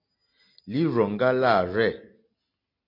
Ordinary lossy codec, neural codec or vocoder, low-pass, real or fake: MP3, 32 kbps; none; 5.4 kHz; real